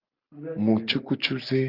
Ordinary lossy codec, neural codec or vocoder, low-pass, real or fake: Opus, 24 kbps; none; 5.4 kHz; real